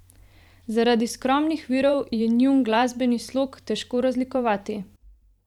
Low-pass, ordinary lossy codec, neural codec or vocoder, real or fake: 19.8 kHz; none; vocoder, 44.1 kHz, 128 mel bands every 512 samples, BigVGAN v2; fake